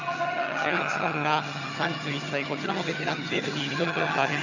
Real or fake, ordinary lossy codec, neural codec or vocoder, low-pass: fake; none; vocoder, 22.05 kHz, 80 mel bands, HiFi-GAN; 7.2 kHz